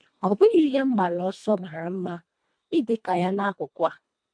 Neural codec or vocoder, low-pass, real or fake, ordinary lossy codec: codec, 24 kHz, 1.5 kbps, HILCodec; 9.9 kHz; fake; MP3, 64 kbps